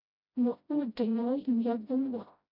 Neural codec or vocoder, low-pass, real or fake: codec, 16 kHz, 0.5 kbps, FreqCodec, smaller model; 5.4 kHz; fake